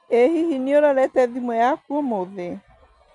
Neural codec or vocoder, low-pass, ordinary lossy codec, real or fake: none; 10.8 kHz; MP3, 64 kbps; real